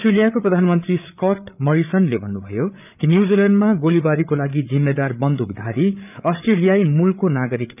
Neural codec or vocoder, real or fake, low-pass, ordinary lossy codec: codec, 16 kHz, 8 kbps, FreqCodec, larger model; fake; 3.6 kHz; none